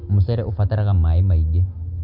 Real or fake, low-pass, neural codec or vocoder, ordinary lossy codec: real; 5.4 kHz; none; none